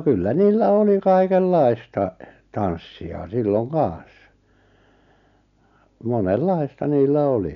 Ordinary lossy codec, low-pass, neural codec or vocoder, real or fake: none; 7.2 kHz; none; real